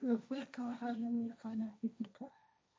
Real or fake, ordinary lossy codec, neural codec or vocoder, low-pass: fake; none; codec, 16 kHz, 1.1 kbps, Voila-Tokenizer; none